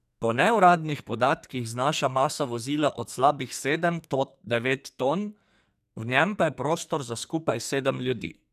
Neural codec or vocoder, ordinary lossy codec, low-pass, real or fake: codec, 44.1 kHz, 2.6 kbps, SNAC; none; 14.4 kHz; fake